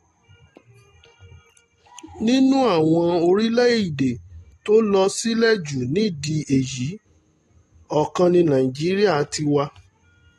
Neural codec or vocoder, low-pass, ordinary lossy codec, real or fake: none; 19.8 kHz; AAC, 32 kbps; real